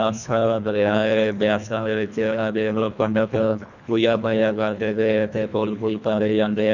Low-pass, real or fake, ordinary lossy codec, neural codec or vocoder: 7.2 kHz; fake; none; codec, 24 kHz, 1.5 kbps, HILCodec